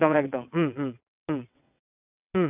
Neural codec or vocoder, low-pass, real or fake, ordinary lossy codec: vocoder, 22.05 kHz, 80 mel bands, WaveNeXt; 3.6 kHz; fake; none